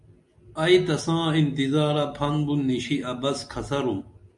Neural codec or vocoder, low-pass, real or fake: none; 10.8 kHz; real